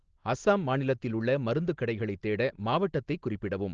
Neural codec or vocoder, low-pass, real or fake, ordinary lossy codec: none; 7.2 kHz; real; Opus, 16 kbps